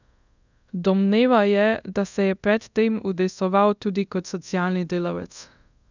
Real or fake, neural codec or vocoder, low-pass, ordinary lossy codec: fake; codec, 24 kHz, 0.5 kbps, DualCodec; 7.2 kHz; none